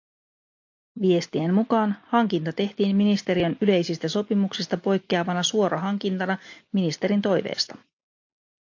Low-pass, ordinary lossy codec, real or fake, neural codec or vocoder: 7.2 kHz; AAC, 48 kbps; real; none